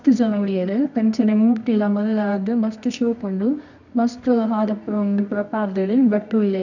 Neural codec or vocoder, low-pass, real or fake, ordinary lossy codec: codec, 24 kHz, 0.9 kbps, WavTokenizer, medium music audio release; 7.2 kHz; fake; none